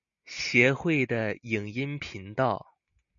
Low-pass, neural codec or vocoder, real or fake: 7.2 kHz; none; real